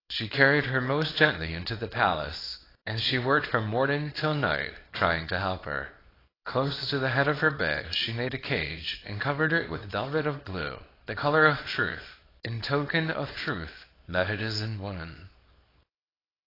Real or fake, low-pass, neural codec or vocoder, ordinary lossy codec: fake; 5.4 kHz; codec, 24 kHz, 0.9 kbps, WavTokenizer, small release; AAC, 24 kbps